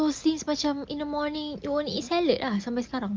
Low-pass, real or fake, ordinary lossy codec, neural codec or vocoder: 7.2 kHz; real; Opus, 24 kbps; none